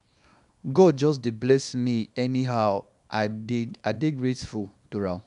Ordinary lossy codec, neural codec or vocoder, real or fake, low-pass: none; codec, 24 kHz, 0.9 kbps, WavTokenizer, small release; fake; 10.8 kHz